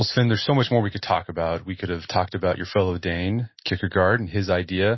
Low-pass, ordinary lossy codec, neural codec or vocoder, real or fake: 7.2 kHz; MP3, 24 kbps; none; real